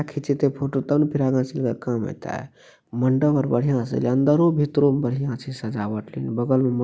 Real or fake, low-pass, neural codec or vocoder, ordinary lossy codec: real; none; none; none